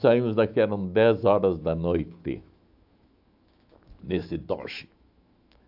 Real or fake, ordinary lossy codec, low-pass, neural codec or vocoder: fake; AAC, 48 kbps; 5.4 kHz; autoencoder, 48 kHz, 128 numbers a frame, DAC-VAE, trained on Japanese speech